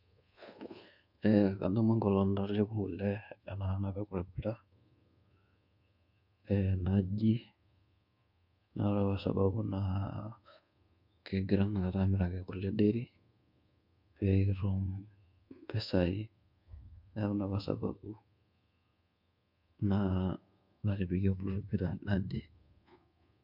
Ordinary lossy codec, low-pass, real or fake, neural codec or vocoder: MP3, 48 kbps; 5.4 kHz; fake; codec, 24 kHz, 1.2 kbps, DualCodec